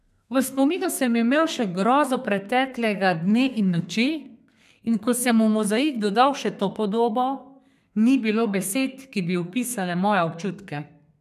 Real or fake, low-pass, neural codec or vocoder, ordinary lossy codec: fake; 14.4 kHz; codec, 32 kHz, 1.9 kbps, SNAC; none